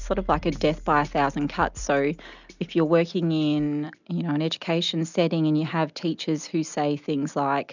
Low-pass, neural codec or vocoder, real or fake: 7.2 kHz; none; real